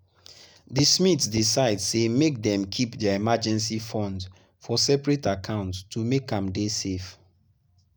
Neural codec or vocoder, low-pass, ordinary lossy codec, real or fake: none; none; none; real